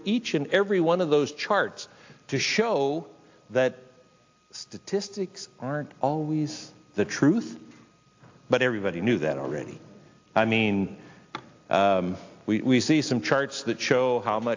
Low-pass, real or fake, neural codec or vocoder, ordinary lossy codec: 7.2 kHz; real; none; AAC, 48 kbps